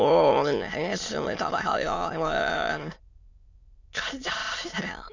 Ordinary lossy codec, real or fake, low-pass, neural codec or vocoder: Opus, 64 kbps; fake; 7.2 kHz; autoencoder, 22.05 kHz, a latent of 192 numbers a frame, VITS, trained on many speakers